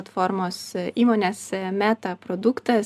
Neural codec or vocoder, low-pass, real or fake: none; 14.4 kHz; real